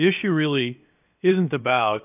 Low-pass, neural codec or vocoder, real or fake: 3.6 kHz; codec, 16 kHz, 0.7 kbps, FocalCodec; fake